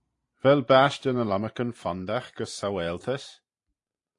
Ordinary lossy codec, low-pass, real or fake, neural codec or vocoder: AAC, 48 kbps; 10.8 kHz; fake; vocoder, 44.1 kHz, 128 mel bands every 512 samples, BigVGAN v2